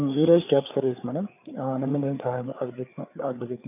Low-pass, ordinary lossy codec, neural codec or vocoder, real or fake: 3.6 kHz; none; codec, 16 kHz, 4 kbps, FreqCodec, larger model; fake